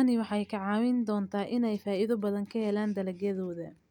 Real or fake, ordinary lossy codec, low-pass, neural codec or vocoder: real; none; 19.8 kHz; none